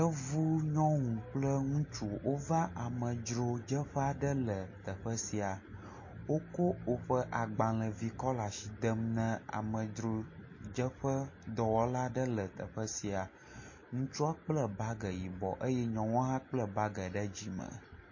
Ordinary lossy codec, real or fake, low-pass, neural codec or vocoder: MP3, 32 kbps; real; 7.2 kHz; none